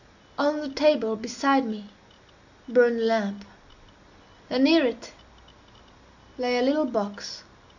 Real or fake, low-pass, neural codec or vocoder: real; 7.2 kHz; none